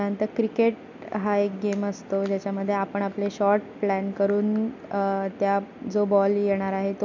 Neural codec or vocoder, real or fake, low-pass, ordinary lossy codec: none; real; 7.2 kHz; none